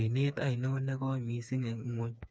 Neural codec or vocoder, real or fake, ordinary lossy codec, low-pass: codec, 16 kHz, 4 kbps, FreqCodec, smaller model; fake; none; none